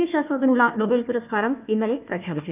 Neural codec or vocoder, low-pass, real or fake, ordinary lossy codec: codec, 16 kHz, 1 kbps, FunCodec, trained on Chinese and English, 50 frames a second; 3.6 kHz; fake; none